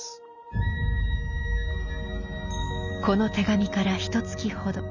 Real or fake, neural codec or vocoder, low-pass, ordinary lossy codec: real; none; 7.2 kHz; none